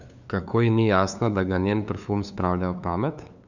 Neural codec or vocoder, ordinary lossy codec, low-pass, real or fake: codec, 16 kHz, 2 kbps, FunCodec, trained on LibriTTS, 25 frames a second; none; 7.2 kHz; fake